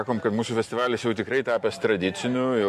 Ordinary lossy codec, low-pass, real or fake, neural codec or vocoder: MP3, 64 kbps; 14.4 kHz; real; none